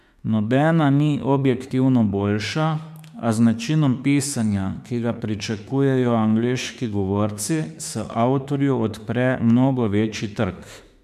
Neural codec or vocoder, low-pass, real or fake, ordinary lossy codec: autoencoder, 48 kHz, 32 numbers a frame, DAC-VAE, trained on Japanese speech; 14.4 kHz; fake; MP3, 96 kbps